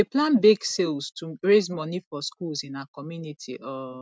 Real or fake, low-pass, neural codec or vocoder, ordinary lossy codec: real; none; none; none